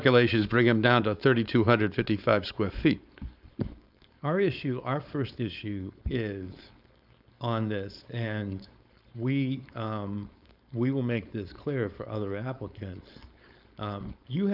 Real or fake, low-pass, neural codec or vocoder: fake; 5.4 kHz; codec, 16 kHz, 4.8 kbps, FACodec